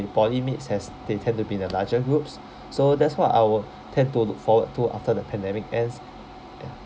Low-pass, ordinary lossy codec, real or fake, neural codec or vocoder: none; none; real; none